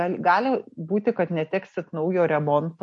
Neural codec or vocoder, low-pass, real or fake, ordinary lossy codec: none; 10.8 kHz; real; MP3, 48 kbps